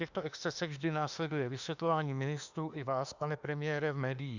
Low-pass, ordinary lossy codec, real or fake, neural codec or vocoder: 7.2 kHz; Opus, 64 kbps; fake; autoencoder, 48 kHz, 32 numbers a frame, DAC-VAE, trained on Japanese speech